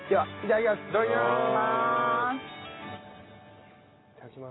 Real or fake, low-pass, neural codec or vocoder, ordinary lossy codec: real; 7.2 kHz; none; AAC, 16 kbps